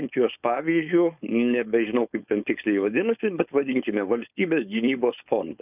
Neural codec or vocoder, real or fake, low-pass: codec, 16 kHz, 4.8 kbps, FACodec; fake; 3.6 kHz